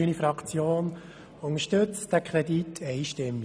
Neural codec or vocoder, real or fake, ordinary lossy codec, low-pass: none; real; none; none